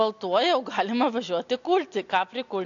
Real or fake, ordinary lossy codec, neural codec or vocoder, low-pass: real; AAC, 48 kbps; none; 7.2 kHz